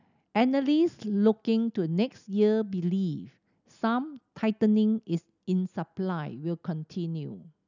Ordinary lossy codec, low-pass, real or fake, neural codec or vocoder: none; 7.2 kHz; real; none